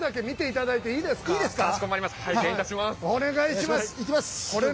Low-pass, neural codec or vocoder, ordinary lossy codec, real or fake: none; none; none; real